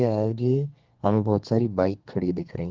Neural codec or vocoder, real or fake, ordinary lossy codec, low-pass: codec, 32 kHz, 1.9 kbps, SNAC; fake; Opus, 32 kbps; 7.2 kHz